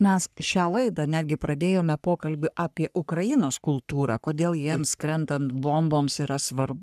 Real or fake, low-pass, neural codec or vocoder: fake; 14.4 kHz; codec, 44.1 kHz, 3.4 kbps, Pupu-Codec